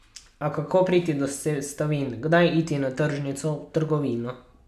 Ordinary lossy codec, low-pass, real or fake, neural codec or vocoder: none; 14.4 kHz; real; none